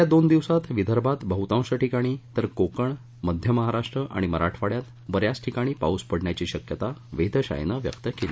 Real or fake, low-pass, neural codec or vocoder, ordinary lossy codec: real; none; none; none